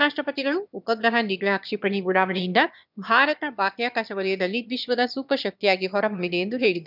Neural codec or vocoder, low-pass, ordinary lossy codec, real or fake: autoencoder, 22.05 kHz, a latent of 192 numbers a frame, VITS, trained on one speaker; 5.4 kHz; none; fake